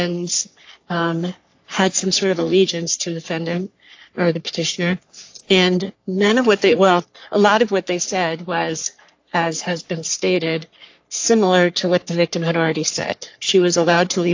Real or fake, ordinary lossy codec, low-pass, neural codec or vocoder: fake; AAC, 48 kbps; 7.2 kHz; codec, 44.1 kHz, 3.4 kbps, Pupu-Codec